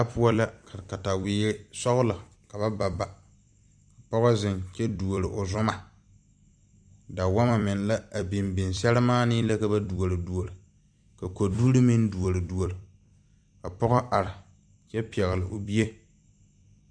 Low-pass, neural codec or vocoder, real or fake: 9.9 kHz; vocoder, 44.1 kHz, 128 mel bands every 256 samples, BigVGAN v2; fake